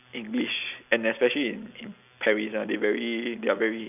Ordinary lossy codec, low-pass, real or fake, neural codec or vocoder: none; 3.6 kHz; real; none